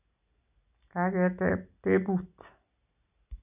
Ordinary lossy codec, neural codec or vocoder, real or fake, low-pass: none; none; real; 3.6 kHz